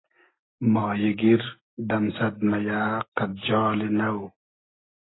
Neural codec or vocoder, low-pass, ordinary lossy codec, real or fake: none; 7.2 kHz; AAC, 16 kbps; real